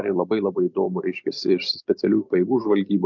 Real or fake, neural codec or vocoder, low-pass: real; none; 7.2 kHz